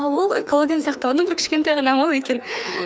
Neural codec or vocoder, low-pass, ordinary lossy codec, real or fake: codec, 16 kHz, 2 kbps, FreqCodec, larger model; none; none; fake